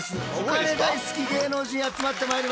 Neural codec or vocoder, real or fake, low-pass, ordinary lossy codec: none; real; none; none